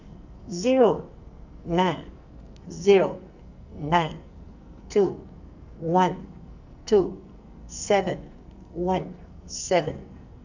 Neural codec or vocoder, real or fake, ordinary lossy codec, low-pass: codec, 44.1 kHz, 2.6 kbps, SNAC; fake; none; 7.2 kHz